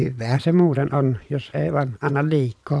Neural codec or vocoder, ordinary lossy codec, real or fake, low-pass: vocoder, 22.05 kHz, 80 mel bands, Vocos; none; fake; none